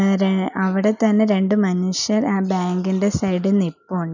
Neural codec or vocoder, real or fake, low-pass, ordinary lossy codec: none; real; 7.2 kHz; none